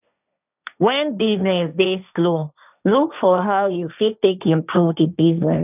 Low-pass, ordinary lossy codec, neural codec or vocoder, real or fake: 3.6 kHz; none; codec, 16 kHz, 1.1 kbps, Voila-Tokenizer; fake